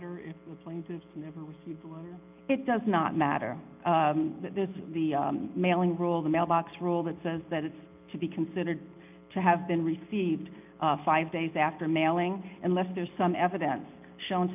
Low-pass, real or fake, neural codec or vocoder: 3.6 kHz; real; none